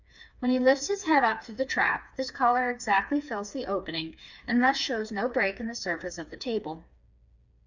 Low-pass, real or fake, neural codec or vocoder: 7.2 kHz; fake; codec, 16 kHz, 4 kbps, FreqCodec, smaller model